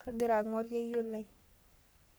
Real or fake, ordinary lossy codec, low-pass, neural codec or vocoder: fake; none; none; codec, 44.1 kHz, 3.4 kbps, Pupu-Codec